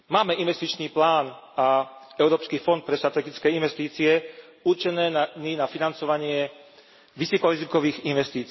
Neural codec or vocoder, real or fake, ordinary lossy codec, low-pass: none; real; MP3, 24 kbps; 7.2 kHz